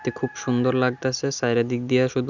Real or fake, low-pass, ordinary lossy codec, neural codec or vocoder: real; 7.2 kHz; none; none